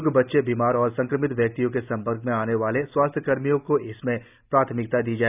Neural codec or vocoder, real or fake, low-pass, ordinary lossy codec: none; real; 3.6 kHz; none